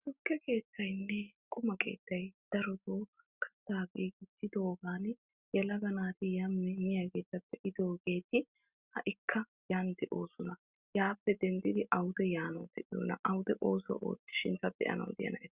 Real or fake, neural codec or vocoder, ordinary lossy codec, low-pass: real; none; Opus, 64 kbps; 3.6 kHz